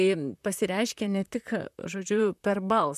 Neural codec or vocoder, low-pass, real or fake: vocoder, 44.1 kHz, 128 mel bands, Pupu-Vocoder; 14.4 kHz; fake